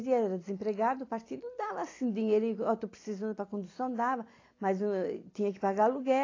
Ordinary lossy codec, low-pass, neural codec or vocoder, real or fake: AAC, 32 kbps; 7.2 kHz; vocoder, 44.1 kHz, 128 mel bands every 256 samples, BigVGAN v2; fake